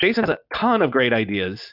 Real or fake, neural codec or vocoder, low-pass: real; none; 5.4 kHz